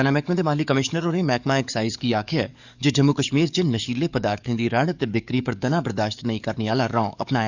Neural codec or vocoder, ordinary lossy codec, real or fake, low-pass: codec, 44.1 kHz, 7.8 kbps, DAC; none; fake; 7.2 kHz